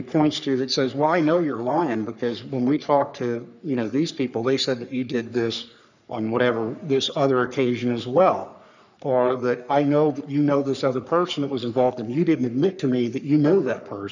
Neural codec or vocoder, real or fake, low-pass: codec, 44.1 kHz, 3.4 kbps, Pupu-Codec; fake; 7.2 kHz